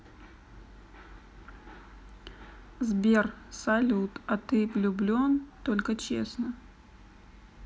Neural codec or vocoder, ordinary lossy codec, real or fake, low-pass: none; none; real; none